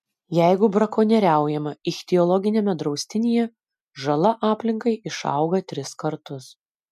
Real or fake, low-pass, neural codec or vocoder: real; 14.4 kHz; none